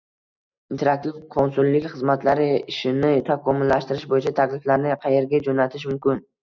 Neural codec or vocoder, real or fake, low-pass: none; real; 7.2 kHz